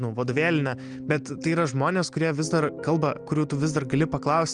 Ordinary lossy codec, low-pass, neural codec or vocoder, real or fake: Opus, 32 kbps; 9.9 kHz; none; real